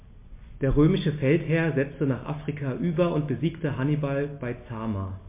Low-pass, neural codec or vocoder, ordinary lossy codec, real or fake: 3.6 kHz; none; MP3, 24 kbps; real